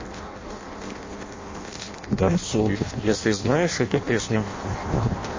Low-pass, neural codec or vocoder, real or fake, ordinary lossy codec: 7.2 kHz; codec, 16 kHz in and 24 kHz out, 0.6 kbps, FireRedTTS-2 codec; fake; MP3, 32 kbps